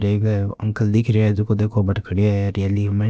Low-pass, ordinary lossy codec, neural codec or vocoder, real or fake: none; none; codec, 16 kHz, about 1 kbps, DyCAST, with the encoder's durations; fake